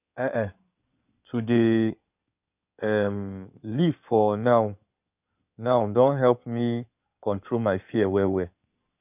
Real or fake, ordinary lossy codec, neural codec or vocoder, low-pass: fake; none; codec, 16 kHz in and 24 kHz out, 2.2 kbps, FireRedTTS-2 codec; 3.6 kHz